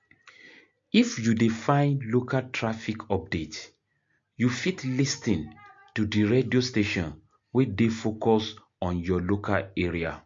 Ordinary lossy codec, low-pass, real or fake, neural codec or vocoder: MP3, 48 kbps; 7.2 kHz; real; none